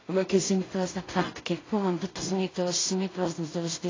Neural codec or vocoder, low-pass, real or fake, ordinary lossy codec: codec, 16 kHz in and 24 kHz out, 0.4 kbps, LongCat-Audio-Codec, two codebook decoder; 7.2 kHz; fake; AAC, 32 kbps